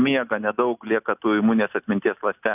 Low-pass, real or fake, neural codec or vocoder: 3.6 kHz; real; none